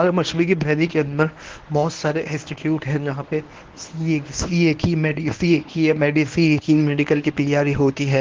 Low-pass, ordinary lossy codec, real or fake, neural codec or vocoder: 7.2 kHz; Opus, 16 kbps; fake; codec, 24 kHz, 0.9 kbps, WavTokenizer, medium speech release version 1